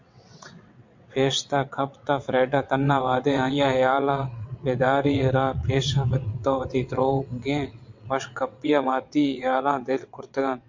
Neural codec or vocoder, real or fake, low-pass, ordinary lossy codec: vocoder, 22.05 kHz, 80 mel bands, WaveNeXt; fake; 7.2 kHz; MP3, 48 kbps